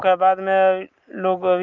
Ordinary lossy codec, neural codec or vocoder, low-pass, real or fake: Opus, 32 kbps; none; 7.2 kHz; real